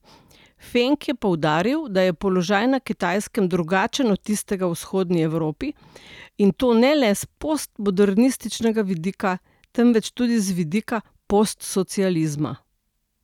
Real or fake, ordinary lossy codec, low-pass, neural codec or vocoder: real; none; 19.8 kHz; none